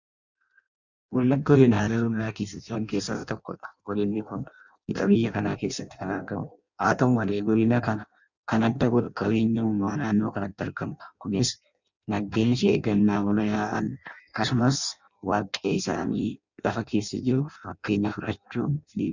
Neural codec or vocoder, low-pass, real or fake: codec, 16 kHz in and 24 kHz out, 0.6 kbps, FireRedTTS-2 codec; 7.2 kHz; fake